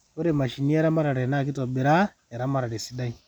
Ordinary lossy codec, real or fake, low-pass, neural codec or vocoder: none; real; 19.8 kHz; none